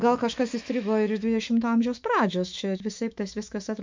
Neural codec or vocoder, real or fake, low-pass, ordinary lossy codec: autoencoder, 48 kHz, 128 numbers a frame, DAC-VAE, trained on Japanese speech; fake; 7.2 kHz; MP3, 64 kbps